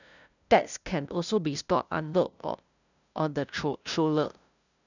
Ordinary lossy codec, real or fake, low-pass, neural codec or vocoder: none; fake; 7.2 kHz; codec, 16 kHz, 0.5 kbps, FunCodec, trained on LibriTTS, 25 frames a second